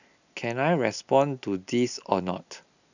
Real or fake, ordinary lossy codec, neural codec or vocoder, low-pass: real; none; none; 7.2 kHz